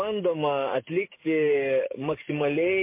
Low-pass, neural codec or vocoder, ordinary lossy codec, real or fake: 3.6 kHz; codec, 44.1 kHz, 7.8 kbps, DAC; MP3, 24 kbps; fake